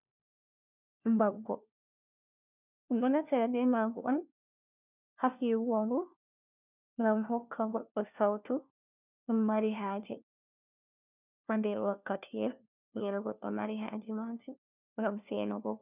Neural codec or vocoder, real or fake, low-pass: codec, 16 kHz, 1 kbps, FunCodec, trained on LibriTTS, 50 frames a second; fake; 3.6 kHz